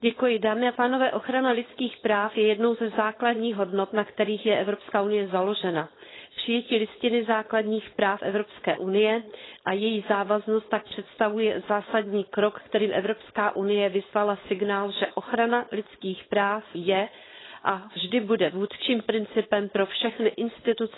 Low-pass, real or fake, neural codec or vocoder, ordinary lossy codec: 7.2 kHz; fake; codec, 16 kHz, 4.8 kbps, FACodec; AAC, 16 kbps